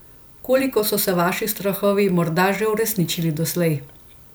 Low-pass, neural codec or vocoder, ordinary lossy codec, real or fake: none; none; none; real